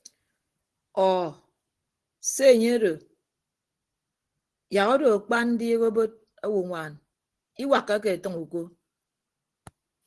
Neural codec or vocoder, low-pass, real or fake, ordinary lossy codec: none; 10.8 kHz; real; Opus, 16 kbps